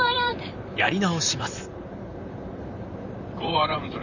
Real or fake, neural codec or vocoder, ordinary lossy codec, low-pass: fake; vocoder, 44.1 kHz, 128 mel bands, Pupu-Vocoder; none; 7.2 kHz